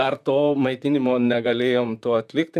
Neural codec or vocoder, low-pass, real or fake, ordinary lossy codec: vocoder, 44.1 kHz, 128 mel bands, Pupu-Vocoder; 14.4 kHz; fake; AAC, 96 kbps